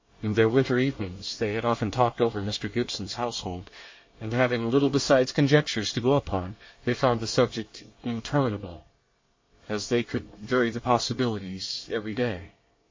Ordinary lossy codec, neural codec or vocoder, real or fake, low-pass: MP3, 32 kbps; codec, 24 kHz, 1 kbps, SNAC; fake; 7.2 kHz